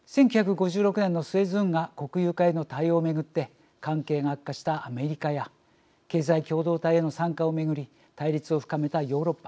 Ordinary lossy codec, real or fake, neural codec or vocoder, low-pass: none; real; none; none